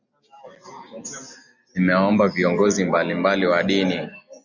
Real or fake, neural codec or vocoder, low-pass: real; none; 7.2 kHz